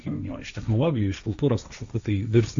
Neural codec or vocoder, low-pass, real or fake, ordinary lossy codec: codec, 16 kHz, 1.1 kbps, Voila-Tokenizer; 7.2 kHz; fake; AAC, 64 kbps